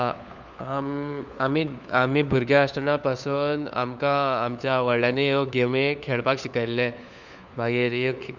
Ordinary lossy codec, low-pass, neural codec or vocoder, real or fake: none; 7.2 kHz; codec, 16 kHz, 4 kbps, FunCodec, trained on LibriTTS, 50 frames a second; fake